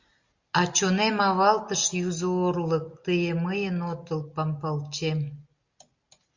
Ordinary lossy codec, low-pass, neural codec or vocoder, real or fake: Opus, 64 kbps; 7.2 kHz; none; real